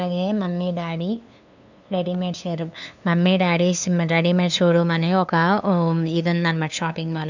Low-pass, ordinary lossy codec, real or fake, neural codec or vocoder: 7.2 kHz; none; fake; codec, 16 kHz, 2 kbps, FunCodec, trained on LibriTTS, 25 frames a second